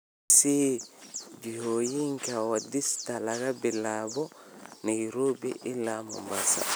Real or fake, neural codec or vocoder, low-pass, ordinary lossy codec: real; none; none; none